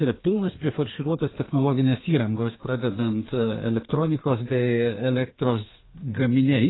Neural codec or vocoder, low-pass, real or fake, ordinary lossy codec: codec, 32 kHz, 1.9 kbps, SNAC; 7.2 kHz; fake; AAC, 16 kbps